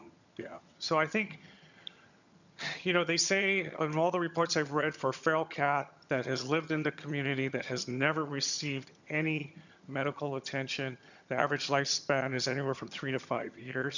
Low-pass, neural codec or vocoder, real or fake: 7.2 kHz; vocoder, 22.05 kHz, 80 mel bands, HiFi-GAN; fake